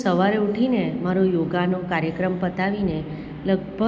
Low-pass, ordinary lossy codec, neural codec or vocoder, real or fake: none; none; none; real